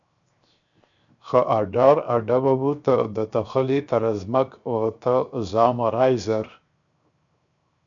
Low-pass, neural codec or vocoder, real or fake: 7.2 kHz; codec, 16 kHz, 0.7 kbps, FocalCodec; fake